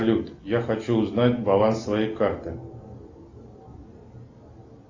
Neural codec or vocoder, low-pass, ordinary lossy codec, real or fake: none; 7.2 kHz; AAC, 48 kbps; real